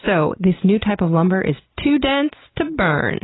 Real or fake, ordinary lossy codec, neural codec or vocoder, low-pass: real; AAC, 16 kbps; none; 7.2 kHz